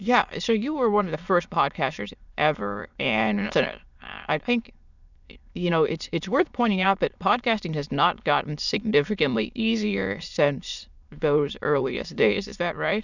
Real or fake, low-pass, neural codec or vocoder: fake; 7.2 kHz; autoencoder, 22.05 kHz, a latent of 192 numbers a frame, VITS, trained on many speakers